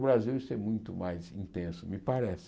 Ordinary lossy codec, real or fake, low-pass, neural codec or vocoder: none; real; none; none